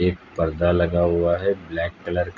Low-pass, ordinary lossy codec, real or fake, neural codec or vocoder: 7.2 kHz; none; real; none